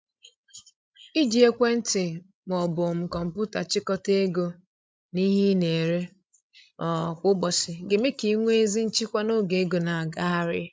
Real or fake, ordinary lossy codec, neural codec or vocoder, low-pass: fake; none; codec, 16 kHz, 16 kbps, FreqCodec, larger model; none